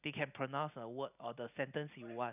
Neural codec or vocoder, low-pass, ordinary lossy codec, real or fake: none; 3.6 kHz; none; real